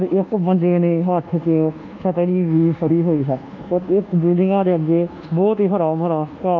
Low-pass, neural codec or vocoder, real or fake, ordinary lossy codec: 7.2 kHz; codec, 24 kHz, 1.2 kbps, DualCodec; fake; none